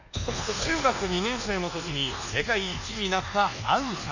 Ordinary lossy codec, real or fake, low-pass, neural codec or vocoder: none; fake; 7.2 kHz; codec, 24 kHz, 1.2 kbps, DualCodec